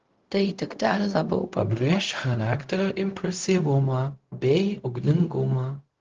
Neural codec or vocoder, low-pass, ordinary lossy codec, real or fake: codec, 16 kHz, 0.4 kbps, LongCat-Audio-Codec; 7.2 kHz; Opus, 16 kbps; fake